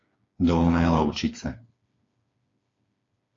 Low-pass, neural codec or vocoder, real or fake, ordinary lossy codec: 7.2 kHz; codec, 16 kHz, 4 kbps, FreqCodec, smaller model; fake; AAC, 32 kbps